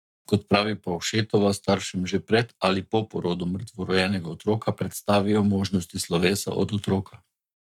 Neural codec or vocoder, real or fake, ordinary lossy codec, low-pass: codec, 44.1 kHz, 7.8 kbps, Pupu-Codec; fake; none; 19.8 kHz